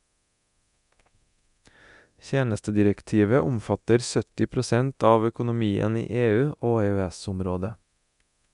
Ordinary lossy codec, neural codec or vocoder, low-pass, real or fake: none; codec, 24 kHz, 0.9 kbps, DualCodec; 10.8 kHz; fake